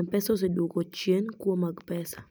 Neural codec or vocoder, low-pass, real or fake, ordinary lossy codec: none; none; real; none